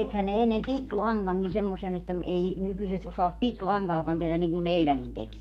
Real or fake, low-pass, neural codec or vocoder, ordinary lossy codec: fake; 14.4 kHz; codec, 32 kHz, 1.9 kbps, SNAC; none